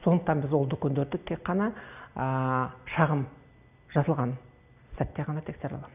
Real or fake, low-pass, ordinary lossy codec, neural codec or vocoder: real; 3.6 kHz; none; none